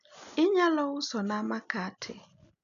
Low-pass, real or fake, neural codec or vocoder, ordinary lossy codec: 7.2 kHz; real; none; none